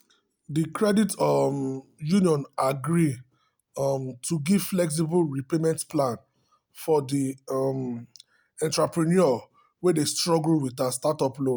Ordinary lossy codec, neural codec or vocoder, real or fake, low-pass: none; none; real; none